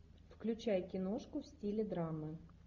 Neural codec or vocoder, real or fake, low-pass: none; real; 7.2 kHz